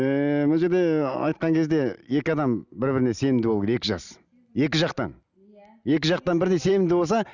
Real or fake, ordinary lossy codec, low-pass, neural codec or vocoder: real; none; 7.2 kHz; none